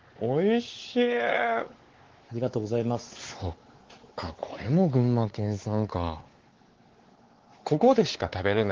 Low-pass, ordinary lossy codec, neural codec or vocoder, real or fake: 7.2 kHz; Opus, 16 kbps; codec, 16 kHz, 4 kbps, X-Codec, WavLM features, trained on Multilingual LibriSpeech; fake